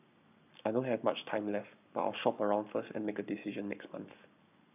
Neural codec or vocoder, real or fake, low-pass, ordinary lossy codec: codec, 44.1 kHz, 7.8 kbps, Pupu-Codec; fake; 3.6 kHz; none